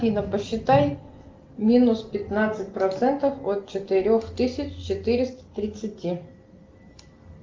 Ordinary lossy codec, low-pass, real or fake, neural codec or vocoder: Opus, 32 kbps; 7.2 kHz; real; none